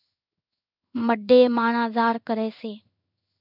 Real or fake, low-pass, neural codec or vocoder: fake; 5.4 kHz; codec, 16 kHz in and 24 kHz out, 1 kbps, XY-Tokenizer